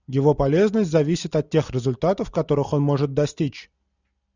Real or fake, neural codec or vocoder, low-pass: real; none; 7.2 kHz